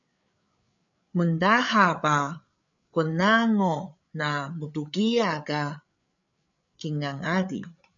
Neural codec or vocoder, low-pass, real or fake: codec, 16 kHz, 8 kbps, FreqCodec, larger model; 7.2 kHz; fake